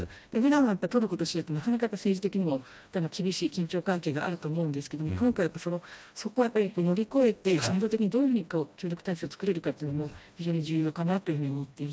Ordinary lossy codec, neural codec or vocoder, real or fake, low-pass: none; codec, 16 kHz, 1 kbps, FreqCodec, smaller model; fake; none